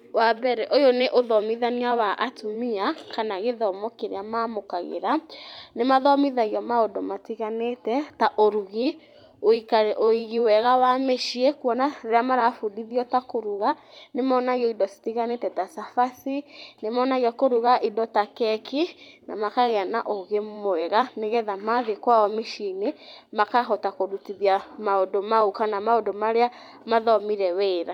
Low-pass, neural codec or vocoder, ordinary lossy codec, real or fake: 19.8 kHz; vocoder, 44.1 kHz, 128 mel bands every 512 samples, BigVGAN v2; none; fake